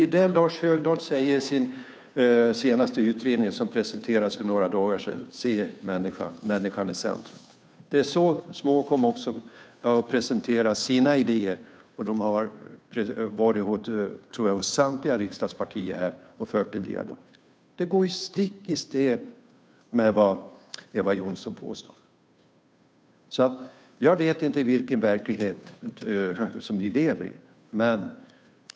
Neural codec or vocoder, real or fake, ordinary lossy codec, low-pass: codec, 16 kHz, 2 kbps, FunCodec, trained on Chinese and English, 25 frames a second; fake; none; none